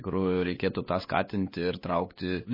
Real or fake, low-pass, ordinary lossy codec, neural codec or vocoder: fake; 5.4 kHz; MP3, 24 kbps; codec, 16 kHz, 8 kbps, FunCodec, trained on LibriTTS, 25 frames a second